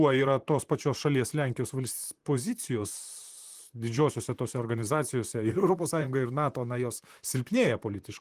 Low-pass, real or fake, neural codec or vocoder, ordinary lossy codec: 14.4 kHz; fake; vocoder, 44.1 kHz, 128 mel bands, Pupu-Vocoder; Opus, 24 kbps